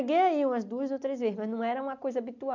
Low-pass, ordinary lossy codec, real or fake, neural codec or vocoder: 7.2 kHz; none; real; none